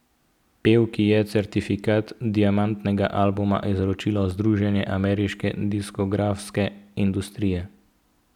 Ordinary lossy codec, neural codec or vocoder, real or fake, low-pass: none; none; real; 19.8 kHz